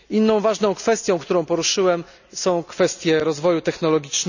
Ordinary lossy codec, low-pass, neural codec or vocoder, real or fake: none; 7.2 kHz; none; real